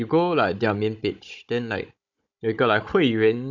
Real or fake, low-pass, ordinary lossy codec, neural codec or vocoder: fake; 7.2 kHz; none; codec, 16 kHz, 16 kbps, FunCodec, trained on Chinese and English, 50 frames a second